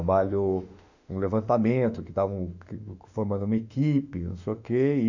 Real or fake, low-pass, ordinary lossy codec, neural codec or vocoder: fake; 7.2 kHz; none; autoencoder, 48 kHz, 32 numbers a frame, DAC-VAE, trained on Japanese speech